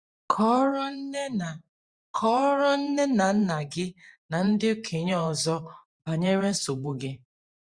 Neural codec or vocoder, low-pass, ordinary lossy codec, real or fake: vocoder, 44.1 kHz, 128 mel bands every 512 samples, BigVGAN v2; 9.9 kHz; Opus, 64 kbps; fake